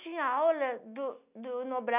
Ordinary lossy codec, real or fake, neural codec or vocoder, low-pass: none; real; none; 3.6 kHz